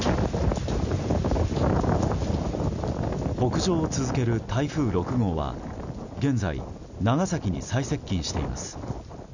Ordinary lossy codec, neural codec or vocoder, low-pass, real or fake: none; none; 7.2 kHz; real